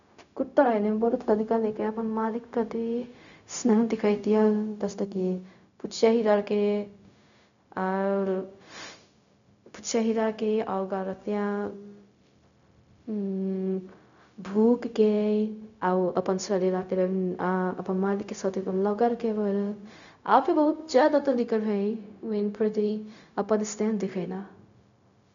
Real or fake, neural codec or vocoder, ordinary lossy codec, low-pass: fake; codec, 16 kHz, 0.4 kbps, LongCat-Audio-Codec; none; 7.2 kHz